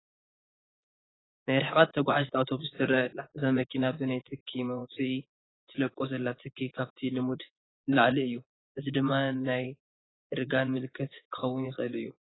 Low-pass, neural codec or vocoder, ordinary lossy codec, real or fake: 7.2 kHz; vocoder, 24 kHz, 100 mel bands, Vocos; AAC, 16 kbps; fake